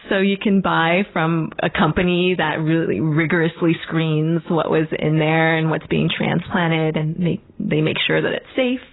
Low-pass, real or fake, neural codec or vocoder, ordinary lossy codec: 7.2 kHz; real; none; AAC, 16 kbps